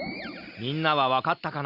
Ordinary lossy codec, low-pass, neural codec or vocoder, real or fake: Opus, 64 kbps; 5.4 kHz; none; real